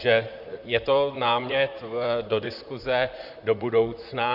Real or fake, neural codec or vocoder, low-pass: fake; vocoder, 44.1 kHz, 128 mel bands, Pupu-Vocoder; 5.4 kHz